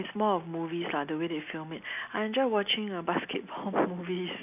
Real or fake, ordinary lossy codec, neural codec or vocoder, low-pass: real; none; none; 3.6 kHz